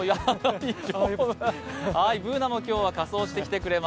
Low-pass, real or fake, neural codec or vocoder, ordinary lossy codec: none; real; none; none